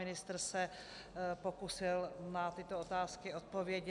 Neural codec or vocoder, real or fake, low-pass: none; real; 10.8 kHz